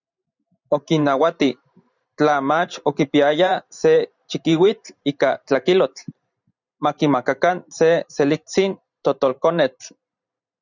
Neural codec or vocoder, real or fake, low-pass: vocoder, 44.1 kHz, 128 mel bands every 512 samples, BigVGAN v2; fake; 7.2 kHz